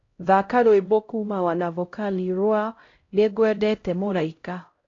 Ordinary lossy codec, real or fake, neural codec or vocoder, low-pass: AAC, 32 kbps; fake; codec, 16 kHz, 0.5 kbps, X-Codec, HuBERT features, trained on LibriSpeech; 7.2 kHz